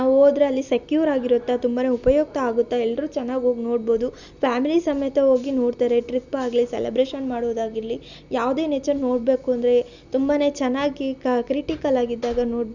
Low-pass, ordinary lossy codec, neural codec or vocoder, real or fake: 7.2 kHz; none; none; real